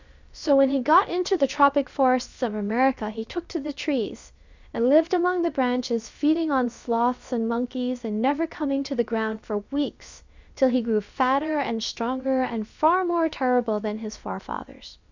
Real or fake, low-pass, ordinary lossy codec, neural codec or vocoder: fake; 7.2 kHz; Opus, 64 kbps; codec, 16 kHz, about 1 kbps, DyCAST, with the encoder's durations